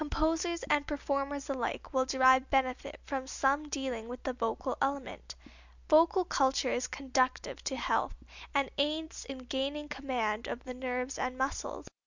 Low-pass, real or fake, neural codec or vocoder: 7.2 kHz; real; none